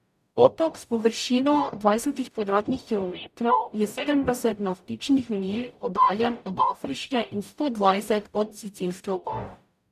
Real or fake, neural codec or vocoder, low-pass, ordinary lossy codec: fake; codec, 44.1 kHz, 0.9 kbps, DAC; 14.4 kHz; none